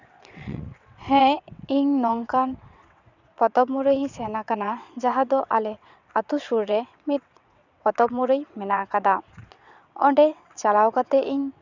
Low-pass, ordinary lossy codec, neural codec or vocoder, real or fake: 7.2 kHz; none; vocoder, 22.05 kHz, 80 mel bands, WaveNeXt; fake